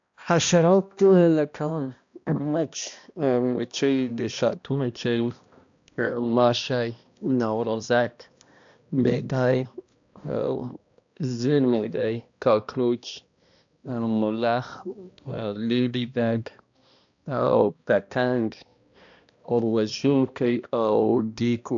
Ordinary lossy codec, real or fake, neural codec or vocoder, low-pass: AAC, 64 kbps; fake; codec, 16 kHz, 1 kbps, X-Codec, HuBERT features, trained on balanced general audio; 7.2 kHz